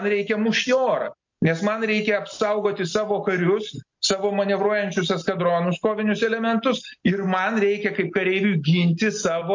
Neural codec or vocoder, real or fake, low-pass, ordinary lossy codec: none; real; 7.2 kHz; MP3, 48 kbps